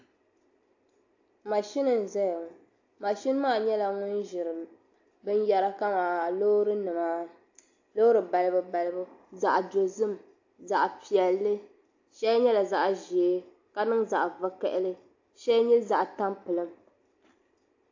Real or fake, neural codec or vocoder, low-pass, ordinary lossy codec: real; none; 7.2 kHz; AAC, 48 kbps